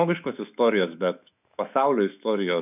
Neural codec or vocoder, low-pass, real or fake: autoencoder, 48 kHz, 128 numbers a frame, DAC-VAE, trained on Japanese speech; 3.6 kHz; fake